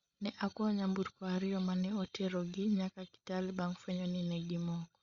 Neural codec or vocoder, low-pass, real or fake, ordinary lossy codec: codec, 16 kHz, 16 kbps, FreqCodec, larger model; 7.2 kHz; fake; Opus, 64 kbps